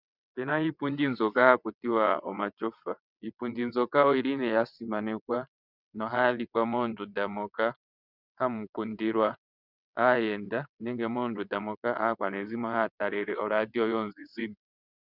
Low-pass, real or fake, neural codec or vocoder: 5.4 kHz; fake; vocoder, 22.05 kHz, 80 mel bands, WaveNeXt